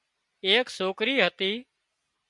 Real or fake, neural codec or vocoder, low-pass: real; none; 10.8 kHz